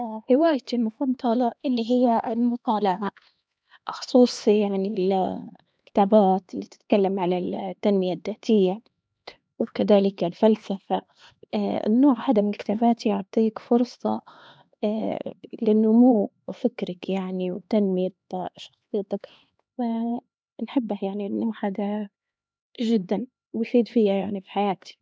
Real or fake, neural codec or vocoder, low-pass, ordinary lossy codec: fake; codec, 16 kHz, 2 kbps, X-Codec, HuBERT features, trained on LibriSpeech; none; none